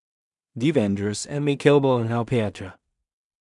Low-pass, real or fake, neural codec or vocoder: 10.8 kHz; fake; codec, 16 kHz in and 24 kHz out, 0.4 kbps, LongCat-Audio-Codec, two codebook decoder